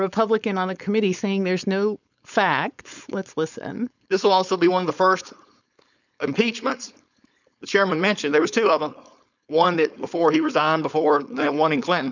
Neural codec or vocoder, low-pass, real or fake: codec, 16 kHz, 4.8 kbps, FACodec; 7.2 kHz; fake